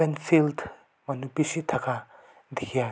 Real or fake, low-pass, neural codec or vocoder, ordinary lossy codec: real; none; none; none